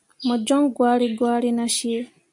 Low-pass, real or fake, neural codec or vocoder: 10.8 kHz; real; none